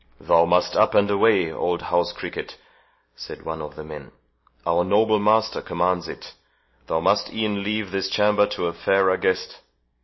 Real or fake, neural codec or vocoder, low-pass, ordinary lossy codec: real; none; 7.2 kHz; MP3, 24 kbps